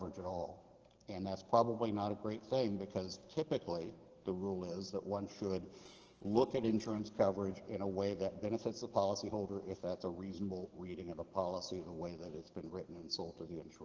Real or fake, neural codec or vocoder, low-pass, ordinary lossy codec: fake; codec, 44.1 kHz, 7.8 kbps, DAC; 7.2 kHz; Opus, 16 kbps